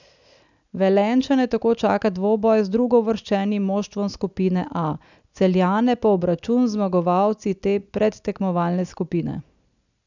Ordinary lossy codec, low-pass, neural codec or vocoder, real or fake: none; 7.2 kHz; none; real